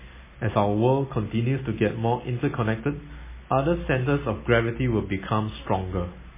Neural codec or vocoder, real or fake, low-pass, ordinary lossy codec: none; real; 3.6 kHz; MP3, 16 kbps